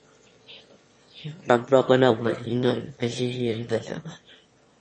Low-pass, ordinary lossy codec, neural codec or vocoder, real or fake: 9.9 kHz; MP3, 32 kbps; autoencoder, 22.05 kHz, a latent of 192 numbers a frame, VITS, trained on one speaker; fake